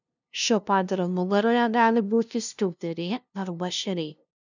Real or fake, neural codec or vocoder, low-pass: fake; codec, 16 kHz, 0.5 kbps, FunCodec, trained on LibriTTS, 25 frames a second; 7.2 kHz